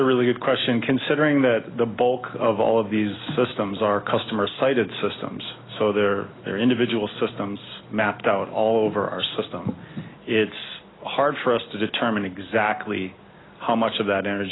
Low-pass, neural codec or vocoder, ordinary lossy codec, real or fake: 7.2 kHz; none; AAC, 16 kbps; real